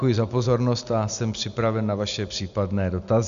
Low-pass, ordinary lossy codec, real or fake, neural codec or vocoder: 7.2 kHz; AAC, 96 kbps; real; none